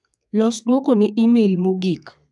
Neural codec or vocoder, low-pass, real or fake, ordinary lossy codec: codec, 32 kHz, 1.9 kbps, SNAC; 10.8 kHz; fake; none